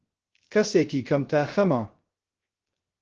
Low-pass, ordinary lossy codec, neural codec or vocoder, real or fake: 7.2 kHz; Opus, 16 kbps; codec, 16 kHz, 0.3 kbps, FocalCodec; fake